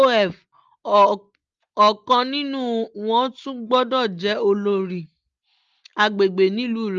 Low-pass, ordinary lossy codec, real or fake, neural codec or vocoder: 7.2 kHz; Opus, 32 kbps; real; none